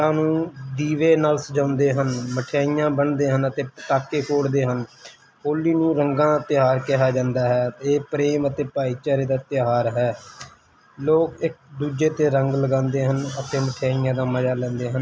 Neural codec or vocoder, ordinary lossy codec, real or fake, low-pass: none; none; real; none